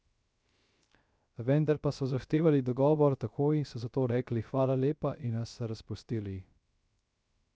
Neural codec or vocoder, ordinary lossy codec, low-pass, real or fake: codec, 16 kHz, 0.3 kbps, FocalCodec; none; none; fake